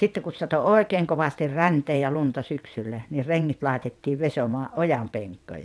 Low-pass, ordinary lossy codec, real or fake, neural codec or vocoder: none; none; fake; vocoder, 22.05 kHz, 80 mel bands, WaveNeXt